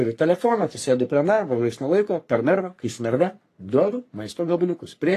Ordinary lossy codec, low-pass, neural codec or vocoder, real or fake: AAC, 48 kbps; 14.4 kHz; codec, 44.1 kHz, 3.4 kbps, Pupu-Codec; fake